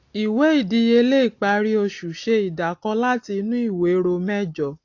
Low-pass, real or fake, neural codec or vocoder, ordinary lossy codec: 7.2 kHz; real; none; AAC, 48 kbps